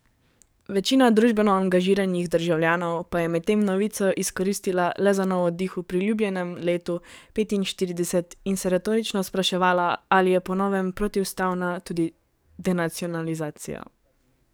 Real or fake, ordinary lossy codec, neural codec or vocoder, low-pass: fake; none; codec, 44.1 kHz, 7.8 kbps, DAC; none